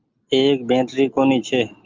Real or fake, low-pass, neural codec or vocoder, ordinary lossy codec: real; 7.2 kHz; none; Opus, 32 kbps